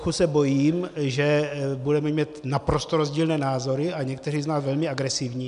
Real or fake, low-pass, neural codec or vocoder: real; 10.8 kHz; none